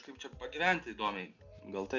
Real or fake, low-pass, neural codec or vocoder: real; 7.2 kHz; none